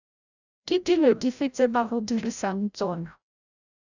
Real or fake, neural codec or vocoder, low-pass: fake; codec, 16 kHz, 0.5 kbps, FreqCodec, larger model; 7.2 kHz